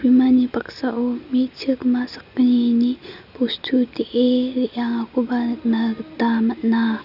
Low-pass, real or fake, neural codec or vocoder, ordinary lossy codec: 5.4 kHz; real; none; none